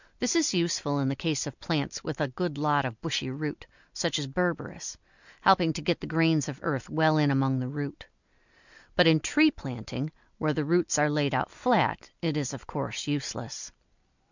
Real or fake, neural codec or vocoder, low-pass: real; none; 7.2 kHz